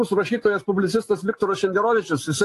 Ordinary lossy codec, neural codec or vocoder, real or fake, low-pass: AAC, 48 kbps; none; real; 14.4 kHz